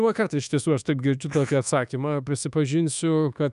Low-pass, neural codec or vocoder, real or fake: 10.8 kHz; codec, 24 kHz, 1.2 kbps, DualCodec; fake